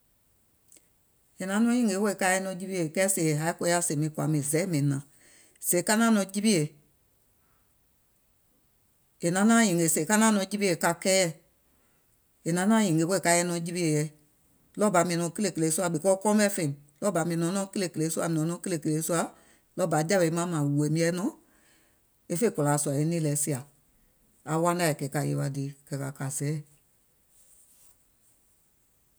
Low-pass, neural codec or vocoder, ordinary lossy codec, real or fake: none; none; none; real